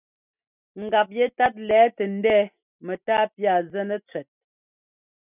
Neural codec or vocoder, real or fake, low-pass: none; real; 3.6 kHz